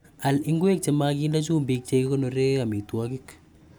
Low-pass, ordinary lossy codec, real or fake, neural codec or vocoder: none; none; real; none